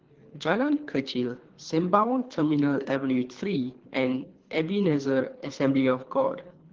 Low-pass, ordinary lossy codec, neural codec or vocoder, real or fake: 7.2 kHz; Opus, 16 kbps; codec, 24 kHz, 3 kbps, HILCodec; fake